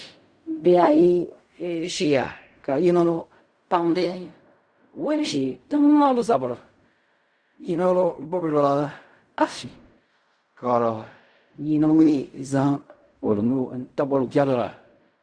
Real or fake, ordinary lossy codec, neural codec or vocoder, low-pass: fake; Opus, 64 kbps; codec, 16 kHz in and 24 kHz out, 0.4 kbps, LongCat-Audio-Codec, fine tuned four codebook decoder; 9.9 kHz